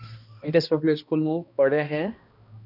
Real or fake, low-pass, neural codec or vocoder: fake; 5.4 kHz; codec, 16 kHz, 1 kbps, X-Codec, HuBERT features, trained on balanced general audio